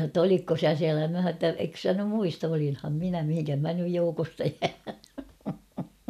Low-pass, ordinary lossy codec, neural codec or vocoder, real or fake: 14.4 kHz; none; none; real